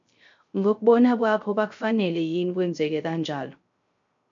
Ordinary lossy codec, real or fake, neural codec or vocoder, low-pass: MP3, 48 kbps; fake; codec, 16 kHz, 0.3 kbps, FocalCodec; 7.2 kHz